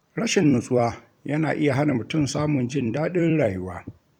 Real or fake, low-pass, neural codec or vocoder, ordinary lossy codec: fake; 19.8 kHz; vocoder, 44.1 kHz, 128 mel bands every 256 samples, BigVGAN v2; none